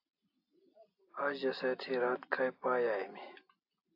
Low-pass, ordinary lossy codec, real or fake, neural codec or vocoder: 5.4 kHz; AAC, 32 kbps; real; none